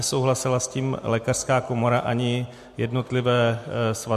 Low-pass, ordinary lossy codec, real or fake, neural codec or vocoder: 14.4 kHz; MP3, 64 kbps; real; none